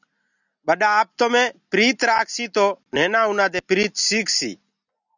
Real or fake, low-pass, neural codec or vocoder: real; 7.2 kHz; none